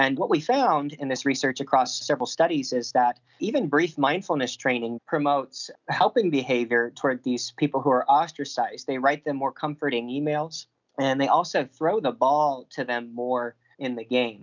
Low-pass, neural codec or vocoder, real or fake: 7.2 kHz; none; real